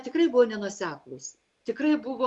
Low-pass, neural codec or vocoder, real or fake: 10.8 kHz; none; real